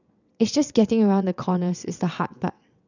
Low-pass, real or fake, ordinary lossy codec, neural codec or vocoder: 7.2 kHz; fake; none; vocoder, 22.05 kHz, 80 mel bands, WaveNeXt